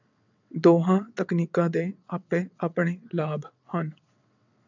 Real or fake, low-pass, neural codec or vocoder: fake; 7.2 kHz; vocoder, 22.05 kHz, 80 mel bands, WaveNeXt